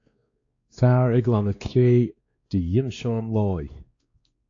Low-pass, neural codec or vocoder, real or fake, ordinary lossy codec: 7.2 kHz; codec, 16 kHz, 2 kbps, X-Codec, WavLM features, trained on Multilingual LibriSpeech; fake; AAC, 48 kbps